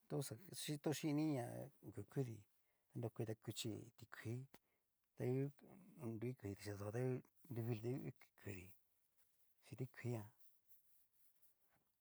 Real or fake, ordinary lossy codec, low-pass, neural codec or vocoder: real; none; none; none